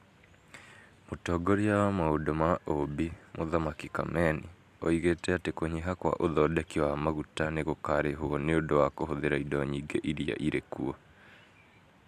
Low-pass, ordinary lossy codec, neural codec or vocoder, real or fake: 14.4 kHz; MP3, 96 kbps; none; real